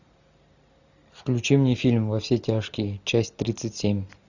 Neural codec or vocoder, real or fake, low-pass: none; real; 7.2 kHz